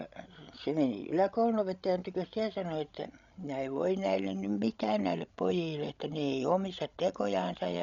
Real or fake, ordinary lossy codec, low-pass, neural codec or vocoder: fake; none; 7.2 kHz; codec, 16 kHz, 16 kbps, FreqCodec, larger model